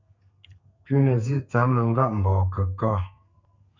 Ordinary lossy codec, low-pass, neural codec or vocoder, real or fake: MP3, 64 kbps; 7.2 kHz; codec, 32 kHz, 1.9 kbps, SNAC; fake